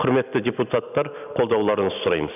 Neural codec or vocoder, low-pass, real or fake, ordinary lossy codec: none; 3.6 kHz; real; none